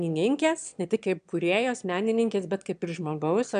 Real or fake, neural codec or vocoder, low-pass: fake; autoencoder, 22.05 kHz, a latent of 192 numbers a frame, VITS, trained on one speaker; 9.9 kHz